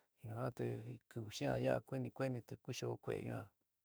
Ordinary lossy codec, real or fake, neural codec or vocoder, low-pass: none; fake; autoencoder, 48 kHz, 32 numbers a frame, DAC-VAE, trained on Japanese speech; none